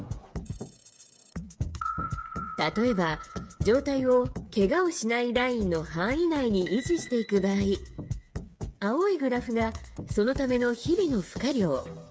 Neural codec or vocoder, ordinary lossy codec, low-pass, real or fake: codec, 16 kHz, 8 kbps, FreqCodec, smaller model; none; none; fake